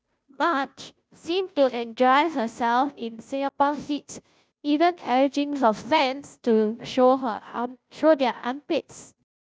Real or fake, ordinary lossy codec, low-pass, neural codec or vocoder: fake; none; none; codec, 16 kHz, 0.5 kbps, FunCodec, trained on Chinese and English, 25 frames a second